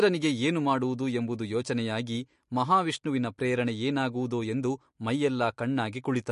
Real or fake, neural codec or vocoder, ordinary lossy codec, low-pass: real; none; MP3, 48 kbps; 14.4 kHz